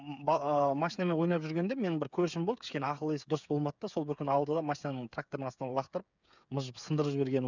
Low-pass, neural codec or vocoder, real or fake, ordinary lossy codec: 7.2 kHz; codec, 16 kHz, 8 kbps, FreqCodec, smaller model; fake; none